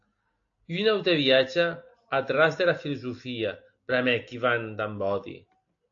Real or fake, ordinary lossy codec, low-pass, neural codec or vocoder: real; AAC, 48 kbps; 7.2 kHz; none